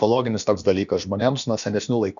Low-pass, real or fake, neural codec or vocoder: 7.2 kHz; fake; codec, 16 kHz, about 1 kbps, DyCAST, with the encoder's durations